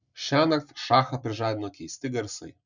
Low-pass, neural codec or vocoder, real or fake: 7.2 kHz; none; real